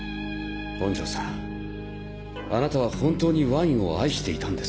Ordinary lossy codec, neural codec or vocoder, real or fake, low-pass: none; none; real; none